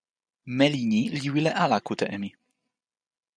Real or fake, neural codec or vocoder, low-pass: real; none; 9.9 kHz